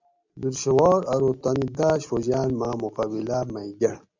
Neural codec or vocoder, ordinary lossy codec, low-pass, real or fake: none; MP3, 64 kbps; 7.2 kHz; real